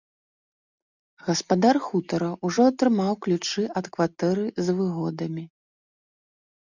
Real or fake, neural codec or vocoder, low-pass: real; none; 7.2 kHz